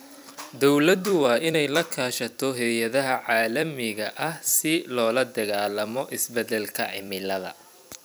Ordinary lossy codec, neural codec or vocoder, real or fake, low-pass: none; vocoder, 44.1 kHz, 128 mel bands every 256 samples, BigVGAN v2; fake; none